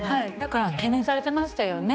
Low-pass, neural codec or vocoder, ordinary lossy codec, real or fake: none; codec, 16 kHz, 2 kbps, X-Codec, HuBERT features, trained on general audio; none; fake